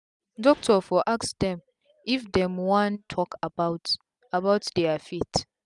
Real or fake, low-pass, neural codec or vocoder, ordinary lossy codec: real; 10.8 kHz; none; none